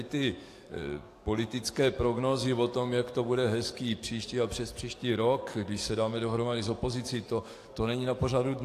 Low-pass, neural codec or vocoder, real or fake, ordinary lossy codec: 14.4 kHz; codec, 44.1 kHz, 7.8 kbps, DAC; fake; AAC, 64 kbps